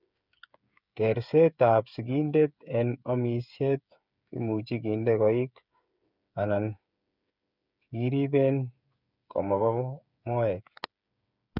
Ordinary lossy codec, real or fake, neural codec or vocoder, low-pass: none; fake; codec, 16 kHz, 8 kbps, FreqCodec, smaller model; 5.4 kHz